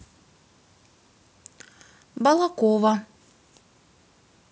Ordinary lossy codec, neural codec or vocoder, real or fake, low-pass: none; none; real; none